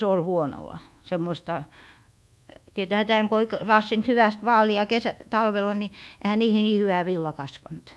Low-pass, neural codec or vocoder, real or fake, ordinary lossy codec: none; codec, 24 kHz, 1.2 kbps, DualCodec; fake; none